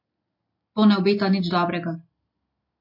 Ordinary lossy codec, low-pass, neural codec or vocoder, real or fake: MP3, 32 kbps; 5.4 kHz; none; real